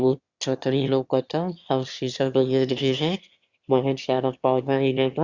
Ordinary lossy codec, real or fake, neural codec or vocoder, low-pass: Opus, 64 kbps; fake; autoencoder, 22.05 kHz, a latent of 192 numbers a frame, VITS, trained on one speaker; 7.2 kHz